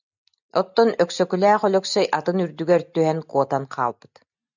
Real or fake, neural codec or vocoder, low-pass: real; none; 7.2 kHz